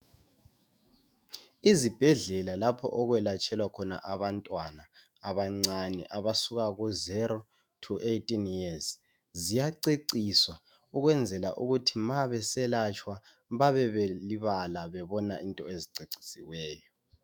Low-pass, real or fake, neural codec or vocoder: 19.8 kHz; fake; autoencoder, 48 kHz, 128 numbers a frame, DAC-VAE, trained on Japanese speech